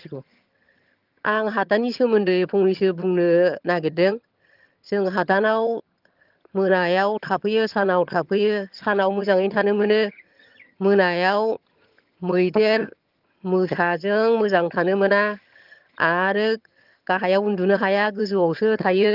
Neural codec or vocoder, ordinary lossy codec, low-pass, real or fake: vocoder, 22.05 kHz, 80 mel bands, HiFi-GAN; Opus, 32 kbps; 5.4 kHz; fake